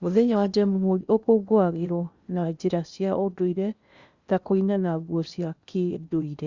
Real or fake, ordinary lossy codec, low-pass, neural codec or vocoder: fake; Opus, 64 kbps; 7.2 kHz; codec, 16 kHz in and 24 kHz out, 0.8 kbps, FocalCodec, streaming, 65536 codes